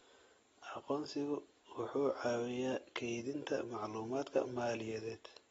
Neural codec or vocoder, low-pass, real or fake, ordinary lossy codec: none; 9.9 kHz; real; AAC, 24 kbps